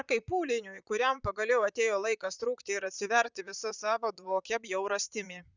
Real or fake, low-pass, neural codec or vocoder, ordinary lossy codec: fake; 7.2 kHz; vocoder, 44.1 kHz, 128 mel bands, Pupu-Vocoder; Opus, 64 kbps